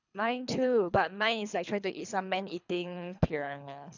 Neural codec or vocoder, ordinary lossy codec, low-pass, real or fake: codec, 24 kHz, 3 kbps, HILCodec; none; 7.2 kHz; fake